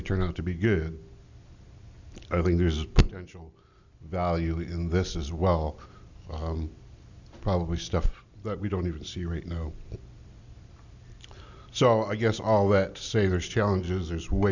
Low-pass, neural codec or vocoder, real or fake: 7.2 kHz; none; real